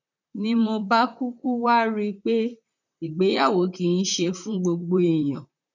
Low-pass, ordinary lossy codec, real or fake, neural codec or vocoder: 7.2 kHz; none; fake; vocoder, 44.1 kHz, 128 mel bands, Pupu-Vocoder